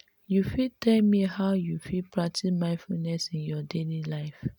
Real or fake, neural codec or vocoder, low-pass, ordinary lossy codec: real; none; 19.8 kHz; MP3, 96 kbps